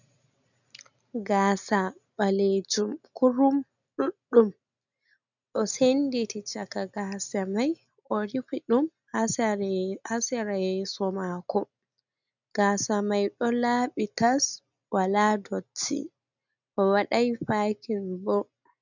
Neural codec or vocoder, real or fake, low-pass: none; real; 7.2 kHz